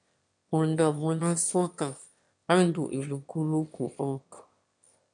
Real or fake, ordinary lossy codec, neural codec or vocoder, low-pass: fake; MP3, 64 kbps; autoencoder, 22.05 kHz, a latent of 192 numbers a frame, VITS, trained on one speaker; 9.9 kHz